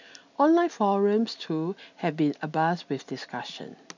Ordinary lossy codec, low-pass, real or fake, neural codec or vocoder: none; 7.2 kHz; real; none